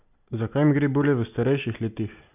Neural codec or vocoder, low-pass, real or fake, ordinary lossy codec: none; 3.6 kHz; real; none